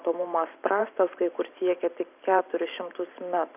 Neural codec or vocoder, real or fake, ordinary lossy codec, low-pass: vocoder, 44.1 kHz, 128 mel bands every 512 samples, BigVGAN v2; fake; AAC, 32 kbps; 3.6 kHz